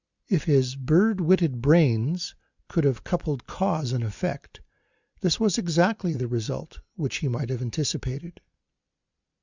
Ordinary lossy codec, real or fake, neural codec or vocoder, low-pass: Opus, 64 kbps; real; none; 7.2 kHz